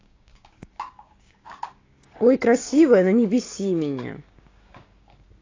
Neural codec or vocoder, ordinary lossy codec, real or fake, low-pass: codec, 16 kHz, 6 kbps, DAC; AAC, 32 kbps; fake; 7.2 kHz